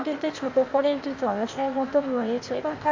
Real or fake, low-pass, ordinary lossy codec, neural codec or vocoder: fake; 7.2 kHz; AAC, 48 kbps; codec, 16 kHz, 0.8 kbps, ZipCodec